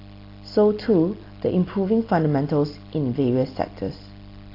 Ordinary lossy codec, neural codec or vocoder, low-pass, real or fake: AAC, 32 kbps; none; 5.4 kHz; real